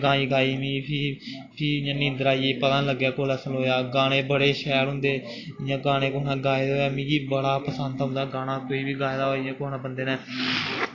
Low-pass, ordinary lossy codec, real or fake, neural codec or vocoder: 7.2 kHz; AAC, 32 kbps; real; none